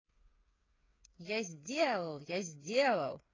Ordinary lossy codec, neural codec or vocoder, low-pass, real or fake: AAC, 32 kbps; codec, 16 kHz, 8 kbps, FreqCodec, smaller model; 7.2 kHz; fake